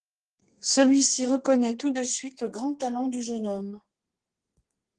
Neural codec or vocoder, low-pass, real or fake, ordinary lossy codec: codec, 32 kHz, 1.9 kbps, SNAC; 10.8 kHz; fake; Opus, 16 kbps